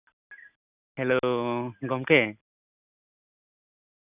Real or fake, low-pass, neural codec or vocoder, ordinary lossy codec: real; 3.6 kHz; none; none